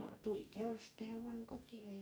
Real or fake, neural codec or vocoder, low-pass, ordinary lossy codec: fake; codec, 44.1 kHz, 2.6 kbps, DAC; none; none